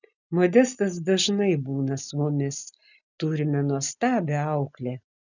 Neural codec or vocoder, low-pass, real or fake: none; 7.2 kHz; real